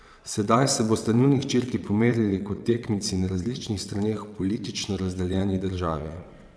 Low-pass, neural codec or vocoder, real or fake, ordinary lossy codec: none; vocoder, 22.05 kHz, 80 mel bands, WaveNeXt; fake; none